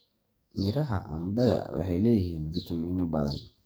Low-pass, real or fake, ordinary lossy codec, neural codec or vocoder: none; fake; none; codec, 44.1 kHz, 2.6 kbps, SNAC